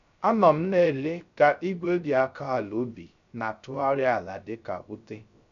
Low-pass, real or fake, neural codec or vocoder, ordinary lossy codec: 7.2 kHz; fake; codec, 16 kHz, 0.3 kbps, FocalCodec; MP3, 96 kbps